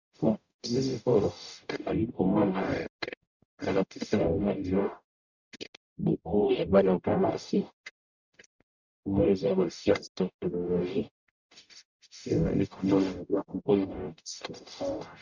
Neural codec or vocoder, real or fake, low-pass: codec, 44.1 kHz, 0.9 kbps, DAC; fake; 7.2 kHz